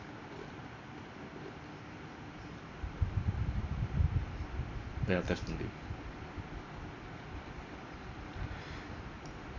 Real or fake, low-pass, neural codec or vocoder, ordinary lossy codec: real; 7.2 kHz; none; none